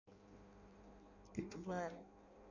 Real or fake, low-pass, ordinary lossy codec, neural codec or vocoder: fake; 7.2 kHz; none; codec, 16 kHz in and 24 kHz out, 0.6 kbps, FireRedTTS-2 codec